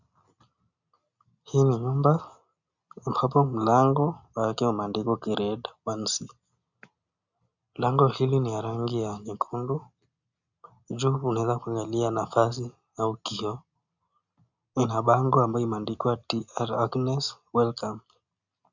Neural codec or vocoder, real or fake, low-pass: none; real; 7.2 kHz